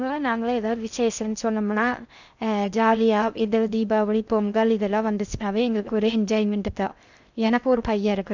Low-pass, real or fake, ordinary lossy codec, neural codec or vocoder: 7.2 kHz; fake; none; codec, 16 kHz in and 24 kHz out, 0.6 kbps, FocalCodec, streaming, 2048 codes